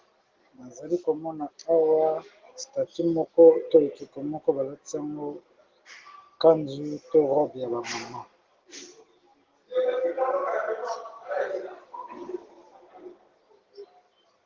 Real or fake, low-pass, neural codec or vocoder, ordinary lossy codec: real; 7.2 kHz; none; Opus, 16 kbps